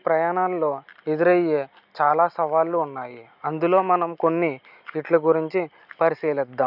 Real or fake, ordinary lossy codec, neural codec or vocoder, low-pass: real; none; none; 5.4 kHz